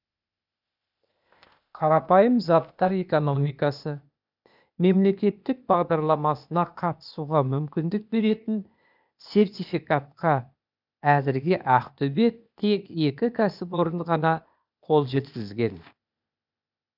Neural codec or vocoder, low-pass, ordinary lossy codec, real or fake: codec, 16 kHz, 0.8 kbps, ZipCodec; 5.4 kHz; none; fake